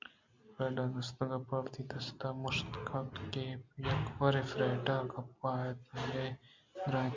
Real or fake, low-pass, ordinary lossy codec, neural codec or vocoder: real; 7.2 kHz; MP3, 64 kbps; none